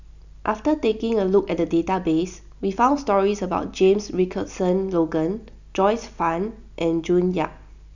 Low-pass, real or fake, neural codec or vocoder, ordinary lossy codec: 7.2 kHz; real; none; none